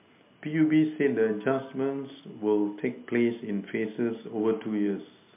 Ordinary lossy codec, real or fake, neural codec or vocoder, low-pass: MP3, 32 kbps; real; none; 3.6 kHz